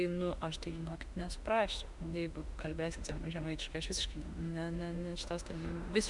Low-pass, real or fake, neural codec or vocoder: 10.8 kHz; fake; autoencoder, 48 kHz, 32 numbers a frame, DAC-VAE, trained on Japanese speech